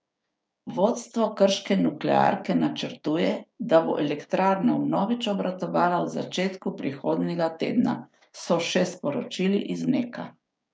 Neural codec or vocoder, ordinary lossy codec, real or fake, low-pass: codec, 16 kHz, 6 kbps, DAC; none; fake; none